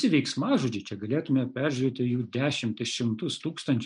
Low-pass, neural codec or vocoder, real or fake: 9.9 kHz; none; real